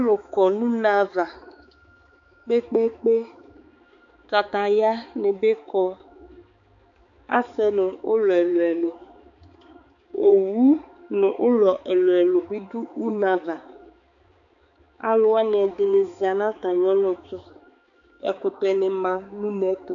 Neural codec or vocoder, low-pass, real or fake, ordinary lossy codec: codec, 16 kHz, 4 kbps, X-Codec, HuBERT features, trained on balanced general audio; 7.2 kHz; fake; AAC, 64 kbps